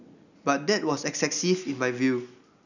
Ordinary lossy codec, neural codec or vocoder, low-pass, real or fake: none; none; 7.2 kHz; real